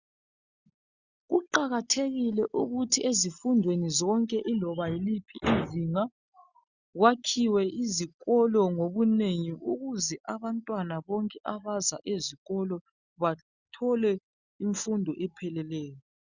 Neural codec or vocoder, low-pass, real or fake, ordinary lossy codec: none; 7.2 kHz; real; Opus, 64 kbps